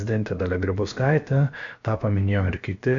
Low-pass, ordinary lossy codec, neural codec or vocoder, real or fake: 7.2 kHz; MP3, 64 kbps; codec, 16 kHz, about 1 kbps, DyCAST, with the encoder's durations; fake